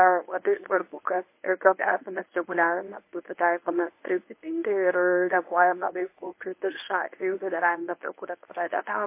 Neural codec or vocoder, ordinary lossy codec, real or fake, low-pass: codec, 24 kHz, 0.9 kbps, WavTokenizer, medium speech release version 1; MP3, 24 kbps; fake; 3.6 kHz